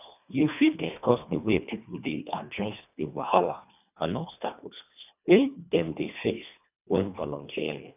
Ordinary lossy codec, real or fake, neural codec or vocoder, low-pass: none; fake; codec, 24 kHz, 1.5 kbps, HILCodec; 3.6 kHz